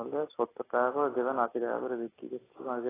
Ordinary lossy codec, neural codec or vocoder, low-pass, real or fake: AAC, 16 kbps; none; 3.6 kHz; real